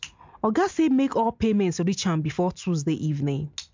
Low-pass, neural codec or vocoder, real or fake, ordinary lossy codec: 7.2 kHz; none; real; MP3, 64 kbps